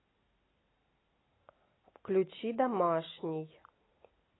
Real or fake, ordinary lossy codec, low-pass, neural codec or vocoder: real; AAC, 16 kbps; 7.2 kHz; none